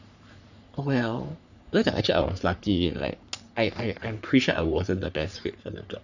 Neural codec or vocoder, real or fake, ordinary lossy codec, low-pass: codec, 44.1 kHz, 3.4 kbps, Pupu-Codec; fake; none; 7.2 kHz